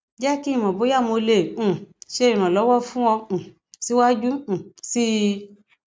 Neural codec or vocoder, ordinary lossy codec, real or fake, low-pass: none; none; real; none